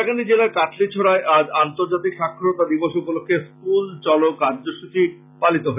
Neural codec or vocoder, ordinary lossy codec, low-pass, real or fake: none; none; 3.6 kHz; real